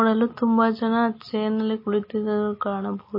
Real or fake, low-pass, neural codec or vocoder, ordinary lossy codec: real; 5.4 kHz; none; MP3, 24 kbps